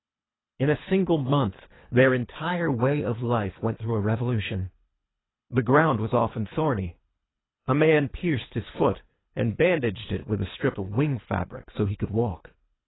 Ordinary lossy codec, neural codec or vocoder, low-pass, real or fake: AAC, 16 kbps; codec, 24 kHz, 3 kbps, HILCodec; 7.2 kHz; fake